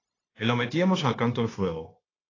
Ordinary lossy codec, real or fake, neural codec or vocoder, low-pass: AAC, 32 kbps; fake; codec, 16 kHz, 0.9 kbps, LongCat-Audio-Codec; 7.2 kHz